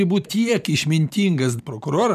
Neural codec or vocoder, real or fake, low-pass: vocoder, 44.1 kHz, 128 mel bands every 512 samples, BigVGAN v2; fake; 14.4 kHz